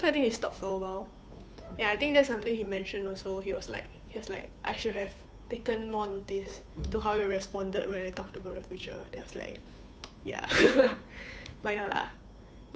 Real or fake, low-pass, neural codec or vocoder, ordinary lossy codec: fake; none; codec, 16 kHz, 2 kbps, FunCodec, trained on Chinese and English, 25 frames a second; none